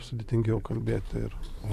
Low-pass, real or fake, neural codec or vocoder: 14.4 kHz; real; none